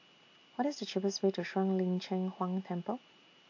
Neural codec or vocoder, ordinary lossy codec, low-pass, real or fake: none; AAC, 48 kbps; 7.2 kHz; real